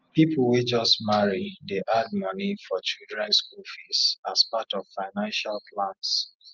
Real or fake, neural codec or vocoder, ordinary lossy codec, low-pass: real; none; Opus, 32 kbps; 7.2 kHz